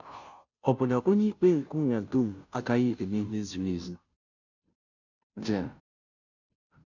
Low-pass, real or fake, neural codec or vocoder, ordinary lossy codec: 7.2 kHz; fake; codec, 16 kHz, 0.5 kbps, FunCodec, trained on Chinese and English, 25 frames a second; none